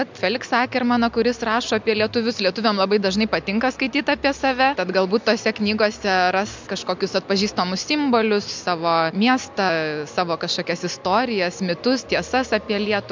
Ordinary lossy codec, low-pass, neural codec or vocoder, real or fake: MP3, 64 kbps; 7.2 kHz; none; real